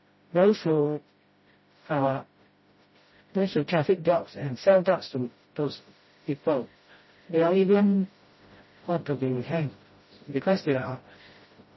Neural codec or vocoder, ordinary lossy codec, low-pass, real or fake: codec, 16 kHz, 0.5 kbps, FreqCodec, smaller model; MP3, 24 kbps; 7.2 kHz; fake